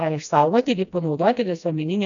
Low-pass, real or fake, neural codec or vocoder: 7.2 kHz; fake; codec, 16 kHz, 1 kbps, FreqCodec, smaller model